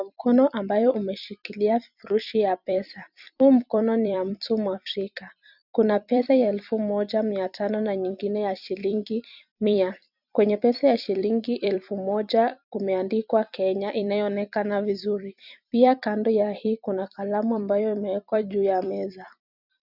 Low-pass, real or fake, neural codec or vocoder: 5.4 kHz; fake; vocoder, 44.1 kHz, 128 mel bands every 256 samples, BigVGAN v2